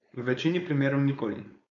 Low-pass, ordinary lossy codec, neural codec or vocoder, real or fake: 7.2 kHz; none; codec, 16 kHz, 4.8 kbps, FACodec; fake